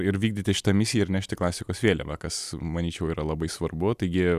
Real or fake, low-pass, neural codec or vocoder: real; 14.4 kHz; none